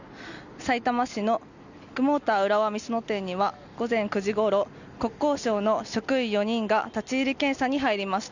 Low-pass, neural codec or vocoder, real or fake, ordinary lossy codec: 7.2 kHz; none; real; none